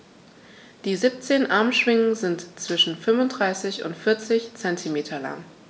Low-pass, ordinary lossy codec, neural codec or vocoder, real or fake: none; none; none; real